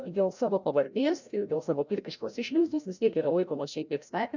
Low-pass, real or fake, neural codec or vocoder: 7.2 kHz; fake; codec, 16 kHz, 0.5 kbps, FreqCodec, larger model